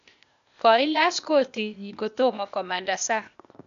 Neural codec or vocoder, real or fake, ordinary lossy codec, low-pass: codec, 16 kHz, 0.8 kbps, ZipCodec; fake; AAC, 96 kbps; 7.2 kHz